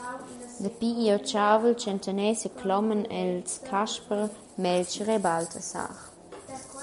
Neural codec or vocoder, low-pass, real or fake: none; 10.8 kHz; real